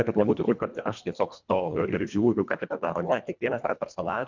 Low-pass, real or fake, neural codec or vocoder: 7.2 kHz; fake; codec, 24 kHz, 1.5 kbps, HILCodec